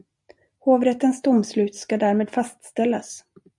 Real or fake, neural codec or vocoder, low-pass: real; none; 10.8 kHz